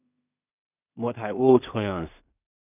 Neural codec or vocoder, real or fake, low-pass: codec, 16 kHz in and 24 kHz out, 0.4 kbps, LongCat-Audio-Codec, two codebook decoder; fake; 3.6 kHz